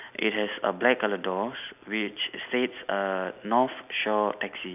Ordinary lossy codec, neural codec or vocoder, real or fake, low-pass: none; none; real; 3.6 kHz